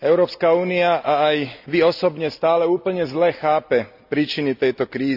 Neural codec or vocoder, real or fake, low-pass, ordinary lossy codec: none; real; 5.4 kHz; none